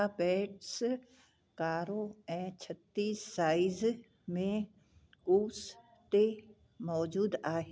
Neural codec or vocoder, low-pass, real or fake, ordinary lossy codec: none; none; real; none